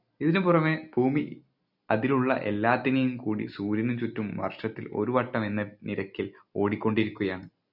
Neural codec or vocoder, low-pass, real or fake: none; 5.4 kHz; real